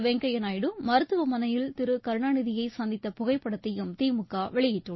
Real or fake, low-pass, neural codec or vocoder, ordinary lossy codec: real; 7.2 kHz; none; MP3, 24 kbps